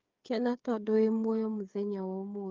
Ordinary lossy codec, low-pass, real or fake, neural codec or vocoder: Opus, 32 kbps; 7.2 kHz; fake; codec, 16 kHz, 8 kbps, FreqCodec, smaller model